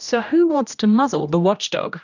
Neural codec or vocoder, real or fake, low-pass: codec, 16 kHz, 1 kbps, X-Codec, HuBERT features, trained on general audio; fake; 7.2 kHz